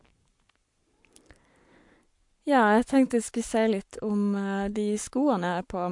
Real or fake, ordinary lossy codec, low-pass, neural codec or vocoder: fake; MP3, 64 kbps; 10.8 kHz; codec, 44.1 kHz, 7.8 kbps, Pupu-Codec